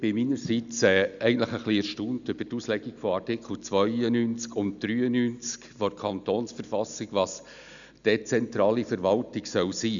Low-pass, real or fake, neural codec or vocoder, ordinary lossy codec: 7.2 kHz; real; none; MP3, 96 kbps